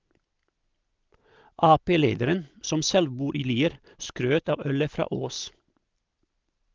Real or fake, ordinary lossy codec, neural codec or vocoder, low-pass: real; Opus, 24 kbps; none; 7.2 kHz